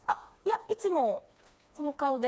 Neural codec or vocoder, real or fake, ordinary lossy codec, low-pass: codec, 16 kHz, 2 kbps, FreqCodec, smaller model; fake; none; none